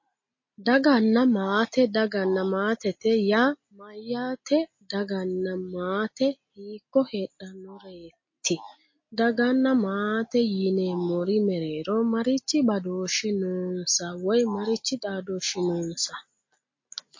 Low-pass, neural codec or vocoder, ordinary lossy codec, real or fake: 7.2 kHz; none; MP3, 32 kbps; real